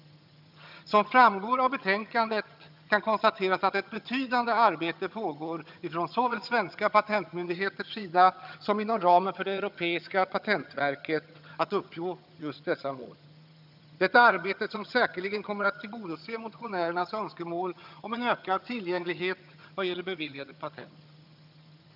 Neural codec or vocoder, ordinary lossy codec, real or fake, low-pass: vocoder, 22.05 kHz, 80 mel bands, HiFi-GAN; none; fake; 5.4 kHz